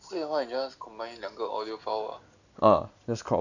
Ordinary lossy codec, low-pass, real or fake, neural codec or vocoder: none; 7.2 kHz; real; none